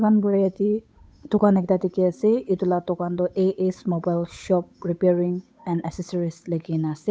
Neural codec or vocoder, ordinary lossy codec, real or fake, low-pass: codec, 16 kHz, 8 kbps, FunCodec, trained on Chinese and English, 25 frames a second; none; fake; none